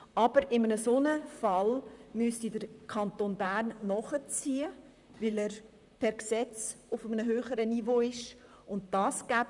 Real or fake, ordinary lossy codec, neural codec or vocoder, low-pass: fake; none; vocoder, 44.1 kHz, 128 mel bands, Pupu-Vocoder; 10.8 kHz